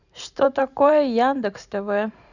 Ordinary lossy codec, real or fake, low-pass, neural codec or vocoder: none; fake; 7.2 kHz; vocoder, 44.1 kHz, 128 mel bands every 512 samples, BigVGAN v2